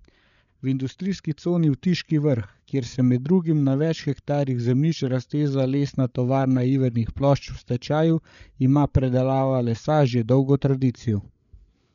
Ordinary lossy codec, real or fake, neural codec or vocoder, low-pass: none; fake; codec, 16 kHz, 8 kbps, FreqCodec, larger model; 7.2 kHz